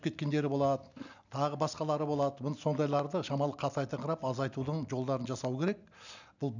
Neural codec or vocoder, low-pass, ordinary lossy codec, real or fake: none; 7.2 kHz; none; real